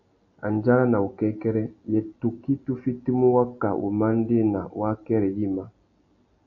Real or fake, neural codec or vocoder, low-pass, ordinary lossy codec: real; none; 7.2 kHz; AAC, 48 kbps